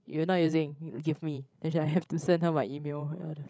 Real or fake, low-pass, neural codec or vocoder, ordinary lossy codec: fake; none; codec, 16 kHz, 16 kbps, FreqCodec, larger model; none